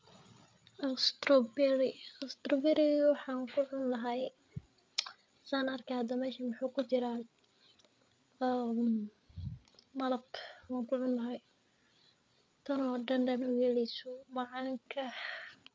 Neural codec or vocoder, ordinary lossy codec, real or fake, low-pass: codec, 16 kHz, 8 kbps, FreqCodec, larger model; none; fake; none